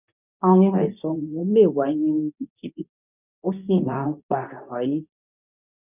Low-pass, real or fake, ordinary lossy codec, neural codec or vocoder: 3.6 kHz; fake; none; codec, 24 kHz, 0.9 kbps, WavTokenizer, medium speech release version 2